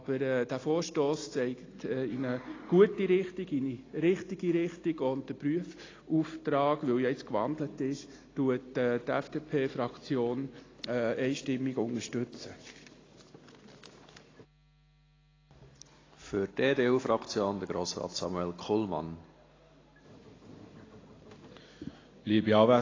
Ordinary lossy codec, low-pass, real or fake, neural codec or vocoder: AAC, 32 kbps; 7.2 kHz; real; none